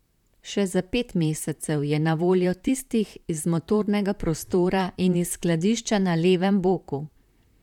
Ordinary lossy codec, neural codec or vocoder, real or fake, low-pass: none; vocoder, 44.1 kHz, 128 mel bands, Pupu-Vocoder; fake; 19.8 kHz